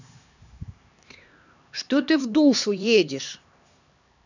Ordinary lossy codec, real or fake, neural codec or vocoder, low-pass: none; fake; codec, 16 kHz, 2 kbps, X-Codec, HuBERT features, trained on LibriSpeech; 7.2 kHz